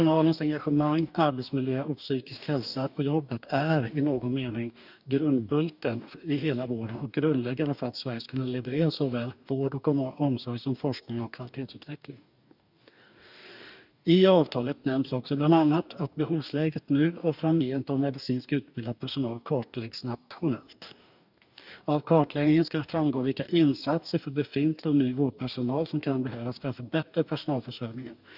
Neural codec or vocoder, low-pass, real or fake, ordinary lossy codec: codec, 44.1 kHz, 2.6 kbps, DAC; 5.4 kHz; fake; none